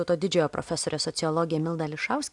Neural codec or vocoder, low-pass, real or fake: none; 10.8 kHz; real